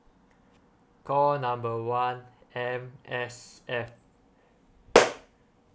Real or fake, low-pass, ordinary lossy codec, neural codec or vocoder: real; none; none; none